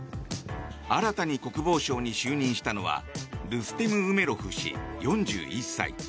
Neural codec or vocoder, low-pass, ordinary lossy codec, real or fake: none; none; none; real